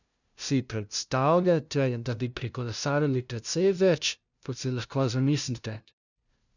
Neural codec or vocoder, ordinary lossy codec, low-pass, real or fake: codec, 16 kHz, 0.5 kbps, FunCodec, trained on LibriTTS, 25 frames a second; none; 7.2 kHz; fake